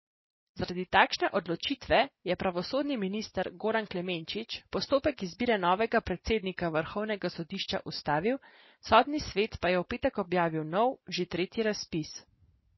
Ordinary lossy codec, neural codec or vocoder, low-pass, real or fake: MP3, 24 kbps; none; 7.2 kHz; real